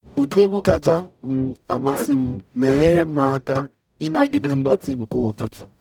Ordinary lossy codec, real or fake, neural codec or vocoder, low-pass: none; fake; codec, 44.1 kHz, 0.9 kbps, DAC; 19.8 kHz